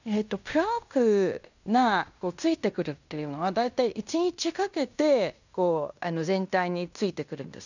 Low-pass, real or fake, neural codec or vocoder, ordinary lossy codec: 7.2 kHz; fake; codec, 16 kHz in and 24 kHz out, 0.9 kbps, LongCat-Audio-Codec, fine tuned four codebook decoder; none